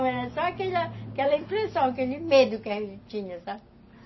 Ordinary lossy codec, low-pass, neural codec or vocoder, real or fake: MP3, 24 kbps; 7.2 kHz; none; real